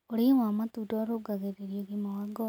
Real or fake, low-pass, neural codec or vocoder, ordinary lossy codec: real; none; none; none